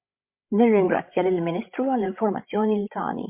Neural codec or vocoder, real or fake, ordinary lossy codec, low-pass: codec, 16 kHz, 16 kbps, FreqCodec, larger model; fake; MP3, 32 kbps; 3.6 kHz